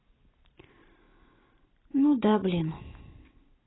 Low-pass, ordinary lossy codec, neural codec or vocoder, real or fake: 7.2 kHz; AAC, 16 kbps; vocoder, 44.1 kHz, 128 mel bands every 256 samples, BigVGAN v2; fake